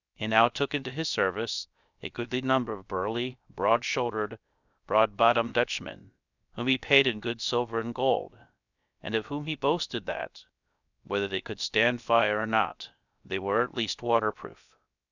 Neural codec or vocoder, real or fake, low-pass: codec, 16 kHz, 0.3 kbps, FocalCodec; fake; 7.2 kHz